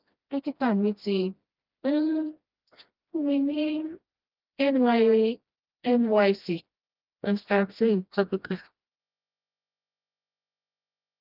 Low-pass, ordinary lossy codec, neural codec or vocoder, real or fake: 5.4 kHz; Opus, 24 kbps; codec, 16 kHz, 1 kbps, FreqCodec, smaller model; fake